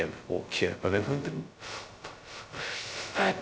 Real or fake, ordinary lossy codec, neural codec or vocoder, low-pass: fake; none; codec, 16 kHz, 0.2 kbps, FocalCodec; none